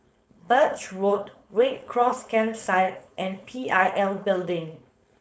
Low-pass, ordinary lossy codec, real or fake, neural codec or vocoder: none; none; fake; codec, 16 kHz, 4.8 kbps, FACodec